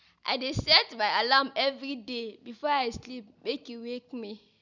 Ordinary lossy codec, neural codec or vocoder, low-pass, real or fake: none; none; 7.2 kHz; real